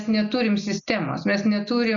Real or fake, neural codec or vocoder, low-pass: real; none; 7.2 kHz